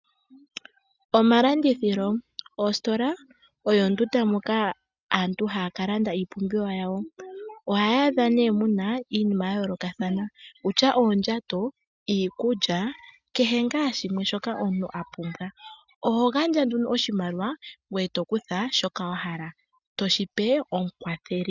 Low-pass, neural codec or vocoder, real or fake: 7.2 kHz; none; real